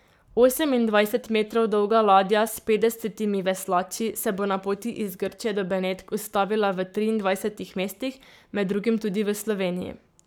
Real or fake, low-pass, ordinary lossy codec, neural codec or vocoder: fake; none; none; codec, 44.1 kHz, 7.8 kbps, Pupu-Codec